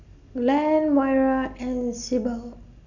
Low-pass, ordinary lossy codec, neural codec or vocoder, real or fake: 7.2 kHz; none; none; real